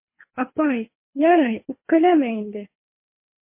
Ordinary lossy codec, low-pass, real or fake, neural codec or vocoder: MP3, 24 kbps; 3.6 kHz; fake; codec, 24 kHz, 3 kbps, HILCodec